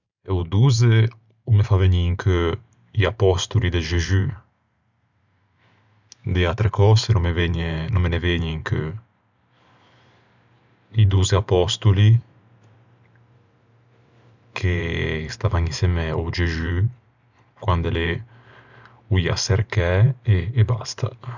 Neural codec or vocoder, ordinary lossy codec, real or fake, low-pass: vocoder, 44.1 kHz, 128 mel bands every 512 samples, BigVGAN v2; none; fake; 7.2 kHz